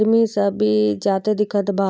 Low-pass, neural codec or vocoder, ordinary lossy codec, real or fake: none; none; none; real